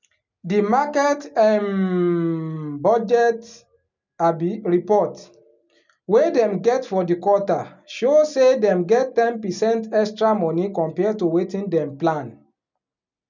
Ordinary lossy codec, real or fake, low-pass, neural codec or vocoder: none; real; 7.2 kHz; none